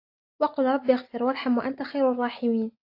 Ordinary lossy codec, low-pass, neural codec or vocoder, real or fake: AAC, 32 kbps; 5.4 kHz; none; real